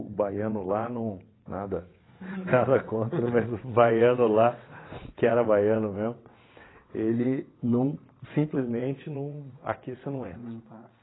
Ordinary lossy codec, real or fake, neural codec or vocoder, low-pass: AAC, 16 kbps; fake; vocoder, 22.05 kHz, 80 mel bands, WaveNeXt; 7.2 kHz